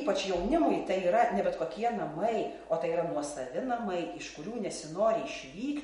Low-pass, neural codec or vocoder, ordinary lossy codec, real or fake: 19.8 kHz; none; MP3, 48 kbps; real